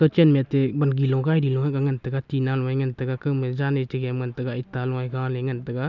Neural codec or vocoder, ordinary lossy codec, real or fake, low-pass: none; none; real; 7.2 kHz